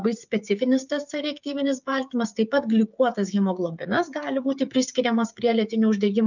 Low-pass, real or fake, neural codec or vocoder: 7.2 kHz; fake; codec, 16 kHz, 16 kbps, FreqCodec, smaller model